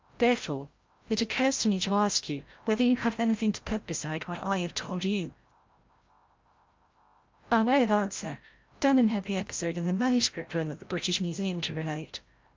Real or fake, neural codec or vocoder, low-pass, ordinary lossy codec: fake; codec, 16 kHz, 0.5 kbps, FreqCodec, larger model; 7.2 kHz; Opus, 24 kbps